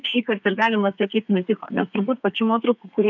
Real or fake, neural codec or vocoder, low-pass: fake; codec, 32 kHz, 1.9 kbps, SNAC; 7.2 kHz